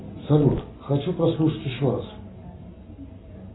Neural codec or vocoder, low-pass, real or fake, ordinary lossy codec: none; 7.2 kHz; real; AAC, 16 kbps